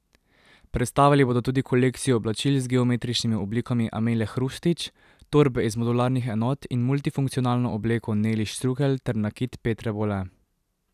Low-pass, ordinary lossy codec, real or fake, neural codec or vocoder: 14.4 kHz; none; real; none